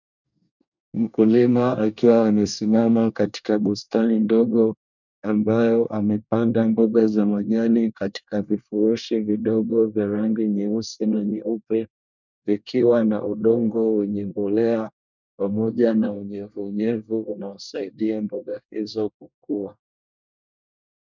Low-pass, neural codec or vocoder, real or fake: 7.2 kHz; codec, 24 kHz, 1 kbps, SNAC; fake